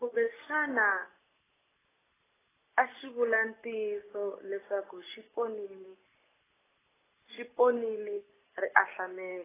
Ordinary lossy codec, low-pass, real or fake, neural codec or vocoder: AAC, 16 kbps; 3.6 kHz; real; none